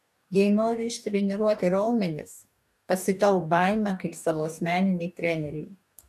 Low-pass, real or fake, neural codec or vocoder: 14.4 kHz; fake; codec, 44.1 kHz, 2.6 kbps, DAC